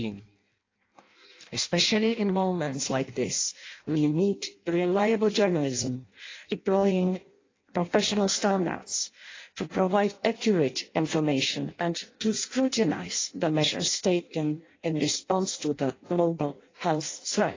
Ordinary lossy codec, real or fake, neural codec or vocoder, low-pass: AAC, 32 kbps; fake; codec, 16 kHz in and 24 kHz out, 0.6 kbps, FireRedTTS-2 codec; 7.2 kHz